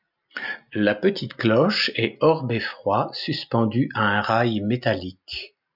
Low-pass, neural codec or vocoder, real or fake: 5.4 kHz; none; real